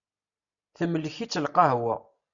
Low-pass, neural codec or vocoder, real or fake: 7.2 kHz; none; real